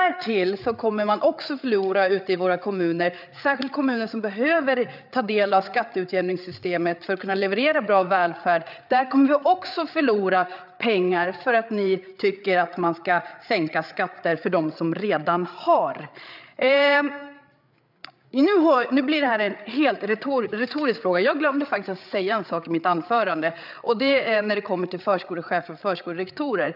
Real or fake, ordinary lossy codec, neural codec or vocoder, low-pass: fake; none; codec, 16 kHz, 8 kbps, FreqCodec, larger model; 5.4 kHz